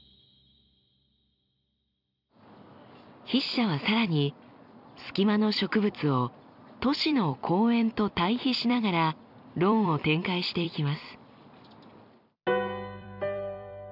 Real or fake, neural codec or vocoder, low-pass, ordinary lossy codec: fake; vocoder, 44.1 kHz, 128 mel bands every 256 samples, BigVGAN v2; 5.4 kHz; none